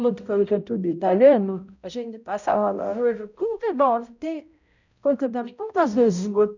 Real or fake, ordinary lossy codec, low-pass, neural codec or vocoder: fake; none; 7.2 kHz; codec, 16 kHz, 0.5 kbps, X-Codec, HuBERT features, trained on balanced general audio